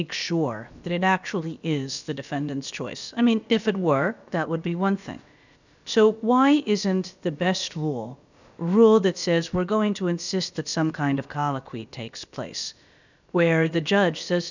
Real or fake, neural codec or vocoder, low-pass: fake; codec, 16 kHz, about 1 kbps, DyCAST, with the encoder's durations; 7.2 kHz